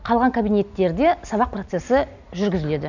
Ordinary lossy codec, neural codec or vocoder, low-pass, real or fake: none; none; 7.2 kHz; real